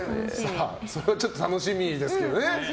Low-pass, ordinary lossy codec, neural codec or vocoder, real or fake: none; none; none; real